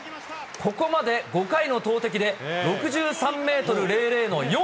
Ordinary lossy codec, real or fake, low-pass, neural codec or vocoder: none; real; none; none